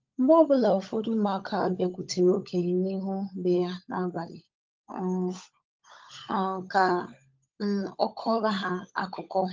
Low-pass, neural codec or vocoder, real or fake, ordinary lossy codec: 7.2 kHz; codec, 16 kHz, 16 kbps, FunCodec, trained on LibriTTS, 50 frames a second; fake; Opus, 32 kbps